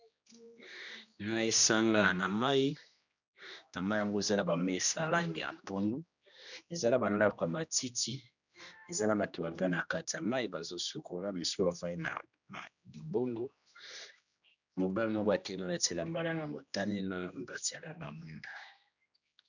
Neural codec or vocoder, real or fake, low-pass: codec, 16 kHz, 1 kbps, X-Codec, HuBERT features, trained on general audio; fake; 7.2 kHz